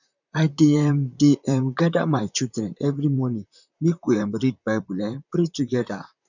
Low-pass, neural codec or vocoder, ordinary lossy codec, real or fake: 7.2 kHz; vocoder, 44.1 kHz, 128 mel bands, Pupu-Vocoder; none; fake